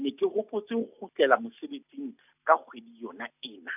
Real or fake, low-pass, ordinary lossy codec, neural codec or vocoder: real; 3.6 kHz; none; none